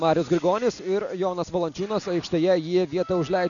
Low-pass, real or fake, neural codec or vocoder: 7.2 kHz; real; none